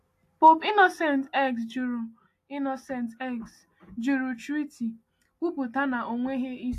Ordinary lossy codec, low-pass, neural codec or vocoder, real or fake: AAC, 64 kbps; 14.4 kHz; none; real